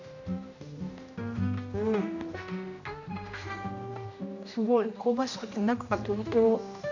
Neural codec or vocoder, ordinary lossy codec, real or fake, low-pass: codec, 16 kHz, 1 kbps, X-Codec, HuBERT features, trained on general audio; none; fake; 7.2 kHz